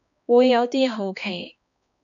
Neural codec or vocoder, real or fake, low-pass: codec, 16 kHz, 2 kbps, X-Codec, HuBERT features, trained on balanced general audio; fake; 7.2 kHz